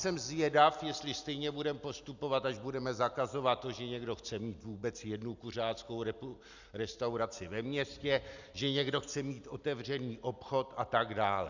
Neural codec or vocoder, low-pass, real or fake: none; 7.2 kHz; real